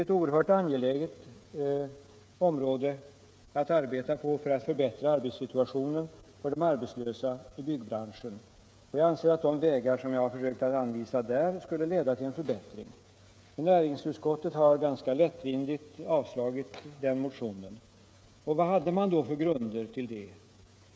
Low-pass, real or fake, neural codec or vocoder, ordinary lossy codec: none; fake; codec, 16 kHz, 16 kbps, FreqCodec, smaller model; none